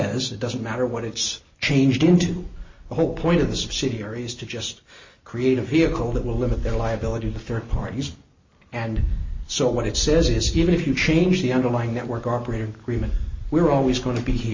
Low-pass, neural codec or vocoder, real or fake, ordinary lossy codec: 7.2 kHz; none; real; MP3, 32 kbps